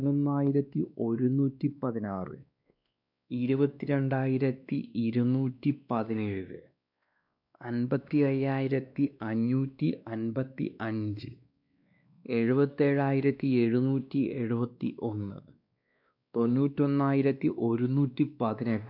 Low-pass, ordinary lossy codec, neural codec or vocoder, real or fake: 5.4 kHz; none; codec, 16 kHz, 2 kbps, X-Codec, WavLM features, trained on Multilingual LibriSpeech; fake